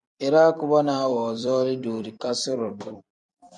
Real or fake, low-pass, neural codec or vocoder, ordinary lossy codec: fake; 10.8 kHz; vocoder, 44.1 kHz, 128 mel bands every 256 samples, BigVGAN v2; MP3, 96 kbps